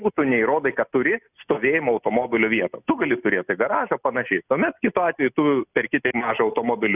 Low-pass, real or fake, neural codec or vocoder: 3.6 kHz; real; none